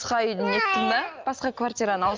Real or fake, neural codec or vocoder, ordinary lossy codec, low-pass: real; none; Opus, 32 kbps; 7.2 kHz